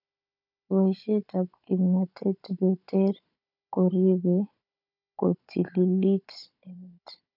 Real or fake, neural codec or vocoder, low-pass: fake; codec, 16 kHz, 16 kbps, FunCodec, trained on Chinese and English, 50 frames a second; 5.4 kHz